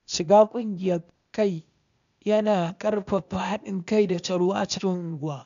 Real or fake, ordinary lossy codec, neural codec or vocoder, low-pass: fake; AAC, 96 kbps; codec, 16 kHz, 0.8 kbps, ZipCodec; 7.2 kHz